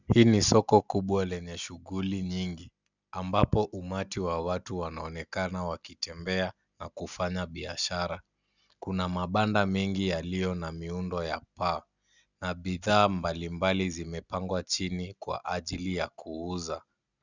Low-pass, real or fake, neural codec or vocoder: 7.2 kHz; real; none